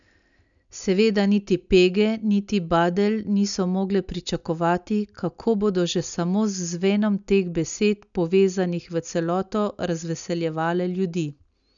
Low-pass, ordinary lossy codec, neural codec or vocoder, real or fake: 7.2 kHz; none; none; real